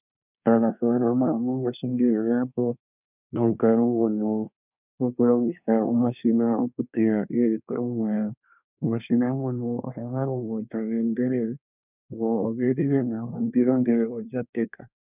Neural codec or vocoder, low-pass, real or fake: codec, 24 kHz, 1 kbps, SNAC; 3.6 kHz; fake